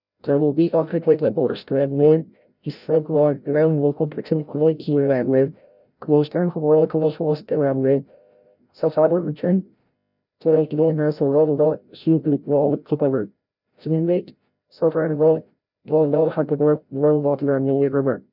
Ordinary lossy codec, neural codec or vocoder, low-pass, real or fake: none; codec, 16 kHz, 0.5 kbps, FreqCodec, larger model; 5.4 kHz; fake